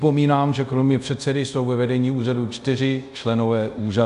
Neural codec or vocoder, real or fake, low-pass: codec, 24 kHz, 0.5 kbps, DualCodec; fake; 10.8 kHz